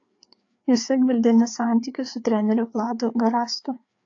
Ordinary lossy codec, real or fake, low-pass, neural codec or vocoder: AAC, 48 kbps; fake; 7.2 kHz; codec, 16 kHz, 4 kbps, FreqCodec, larger model